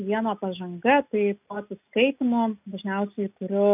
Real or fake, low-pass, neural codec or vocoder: real; 3.6 kHz; none